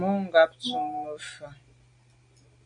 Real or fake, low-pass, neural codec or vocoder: real; 9.9 kHz; none